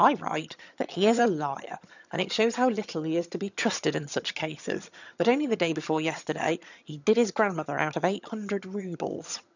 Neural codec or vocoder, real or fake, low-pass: vocoder, 22.05 kHz, 80 mel bands, HiFi-GAN; fake; 7.2 kHz